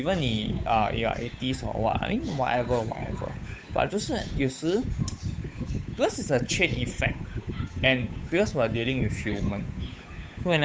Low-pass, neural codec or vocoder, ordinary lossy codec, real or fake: none; codec, 16 kHz, 8 kbps, FunCodec, trained on Chinese and English, 25 frames a second; none; fake